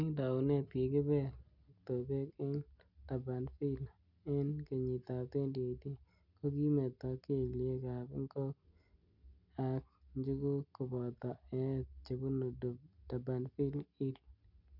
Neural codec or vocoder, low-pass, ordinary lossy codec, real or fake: none; 5.4 kHz; none; real